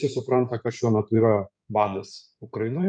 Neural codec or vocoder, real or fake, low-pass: codec, 16 kHz in and 24 kHz out, 2.2 kbps, FireRedTTS-2 codec; fake; 9.9 kHz